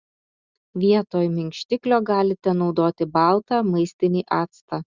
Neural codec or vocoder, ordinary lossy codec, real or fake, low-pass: none; Opus, 64 kbps; real; 7.2 kHz